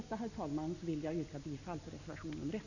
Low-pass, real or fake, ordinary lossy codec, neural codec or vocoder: 7.2 kHz; real; none; none